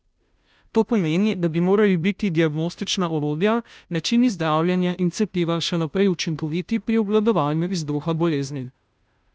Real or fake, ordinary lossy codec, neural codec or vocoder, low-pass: fake; none; codec, 16 kHz, 0.5 kbps, FunCodec, trained on Chinese and English, 25 frames a second; none